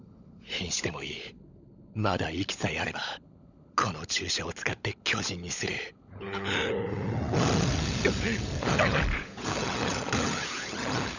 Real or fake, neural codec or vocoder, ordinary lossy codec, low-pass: fake; codec, 16 kHz, 16 kbps, FunCodec, trained on LibriTTS, 50 frames a second; none; 7.2 kHz